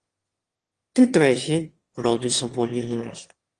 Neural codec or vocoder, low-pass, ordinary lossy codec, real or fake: autoencoder, 22.05 kHz, a latent of 192 numbers a frame, VITS, trained on one speaker; 9.9 kHz; Opus, 24 kbps; fake